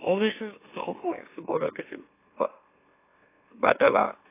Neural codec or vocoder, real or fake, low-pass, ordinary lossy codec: autoencoder, 44.1 kHz, a latent of 192 numbers a frame, MeloTTS; fake; 3.6 kHz; AAC, 24 kbps